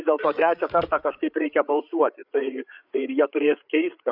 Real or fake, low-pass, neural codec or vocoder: fake; 5.4 kHz; codec, 16 kHz, 8 kbps, FreqCodec, larger model